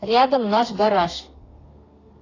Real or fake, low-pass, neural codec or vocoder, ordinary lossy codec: fake; 7.2 kHz; codec, 32 kHz, 1.9 kbps, SNAC; AAC, 32 kbps